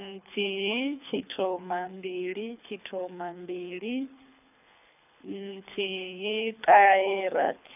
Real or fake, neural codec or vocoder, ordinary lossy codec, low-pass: fake; codec, 24 kHz, 3 kbps, HILCodec; none; 3.6 kHz